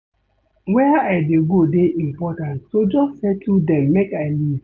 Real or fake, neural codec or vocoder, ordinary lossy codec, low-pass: real; none; none; none